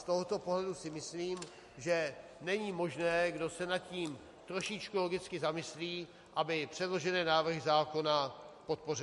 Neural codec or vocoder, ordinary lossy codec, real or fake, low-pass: none; MP3, 48 kbps; real; 14.4 kHz